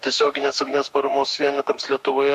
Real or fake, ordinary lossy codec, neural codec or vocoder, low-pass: fake; MP3, 64 kbps; autoencoder, 48 kHz, 32 numbers a frame, DAC-VAE, trained on Japanese speech; 14.4 kHz